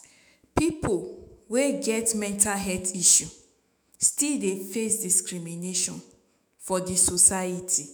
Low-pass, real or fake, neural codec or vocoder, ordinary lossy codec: none; fake; autoencoder, 48 kHz, 128 numbers a frame, DAC-VAE, trained on Japanese speech; none